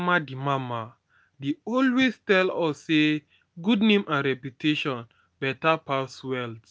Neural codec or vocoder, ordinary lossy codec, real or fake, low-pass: none; none; real; none